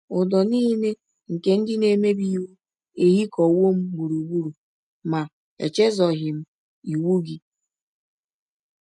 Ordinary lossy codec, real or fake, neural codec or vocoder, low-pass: none; real; none; 10.8 kHz